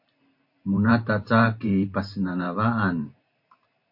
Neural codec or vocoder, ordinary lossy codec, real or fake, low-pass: vocoder, 44.1 kHz, 128 mel bands every 512 samples, BigVGAN v2; MP3, 32 kbps; fake; 5.4 kHz